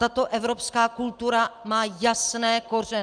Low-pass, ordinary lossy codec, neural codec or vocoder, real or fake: 9.9 kHz; Opus, 32 kbps; none; real